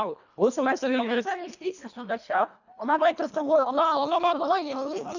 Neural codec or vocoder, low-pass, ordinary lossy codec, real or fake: codec, 24 kHz, 1.5 kbps, HILCodec; 7.2 kHz; none; fake